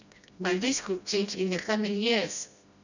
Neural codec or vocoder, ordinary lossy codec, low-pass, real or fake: codec, 16 kHz, 1 kbps, FreqCodec, smaller model; none; 7.2 kHz; fake